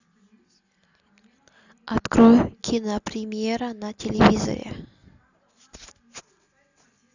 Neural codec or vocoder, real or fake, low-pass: none; real; 7.2 kHz